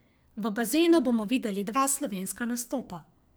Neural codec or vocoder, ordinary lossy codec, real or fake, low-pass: codec, 44.1 kHz, 2.6 kbps, SNAC; none; fake; none